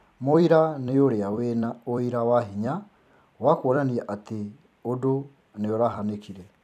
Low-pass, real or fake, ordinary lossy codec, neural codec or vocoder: 14.4 kHz; fake; none; vocoder, 44.1 kHz, 128 mel bands every 256 samples, BigVGAN v2